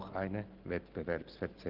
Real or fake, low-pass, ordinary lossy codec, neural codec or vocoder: real; 5.4 kHz; Opus, 16 kbps; none